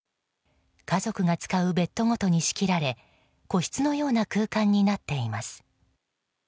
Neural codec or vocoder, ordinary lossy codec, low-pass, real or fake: none; none; none; real